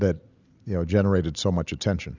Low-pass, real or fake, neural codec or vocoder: 7.2 kHz; real; none